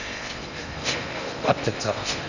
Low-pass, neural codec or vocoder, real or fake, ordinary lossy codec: 7.2 kHz; codec, 16 kHz in and 24 kHz out, 0.6 kbps, FocalCodec, streaming, 2048 codes; fake; none